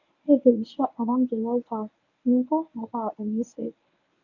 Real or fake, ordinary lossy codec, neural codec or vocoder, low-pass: fake; none; codec, 24 kHz, 0.9 kbps, WavTokenizer, medium speech release version 1; 7.2 kHz